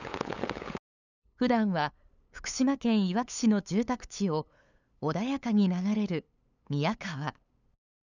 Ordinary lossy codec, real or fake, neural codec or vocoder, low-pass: none; fake; codec, 16 kHz, 8 kbps, FunCodec, trained on LibriTTS, 25 frames a second; 7.2 kHz